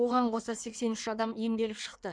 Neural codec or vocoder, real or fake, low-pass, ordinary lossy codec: codec, 16 kHz in and 24 kHz out, 1.1 kbps, FireRedTTS-2 codec; fake; 9.9 kHz; none